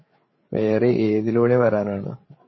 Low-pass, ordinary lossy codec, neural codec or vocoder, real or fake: 7.2 kHz; MP3, 24 kbps; codec, 16 kHz, 8 kbps, FreqCodec, larger model; fake